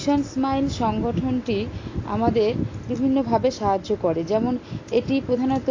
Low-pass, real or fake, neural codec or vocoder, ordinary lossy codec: 7.2 kHz; real; none; AAC, 32 kbps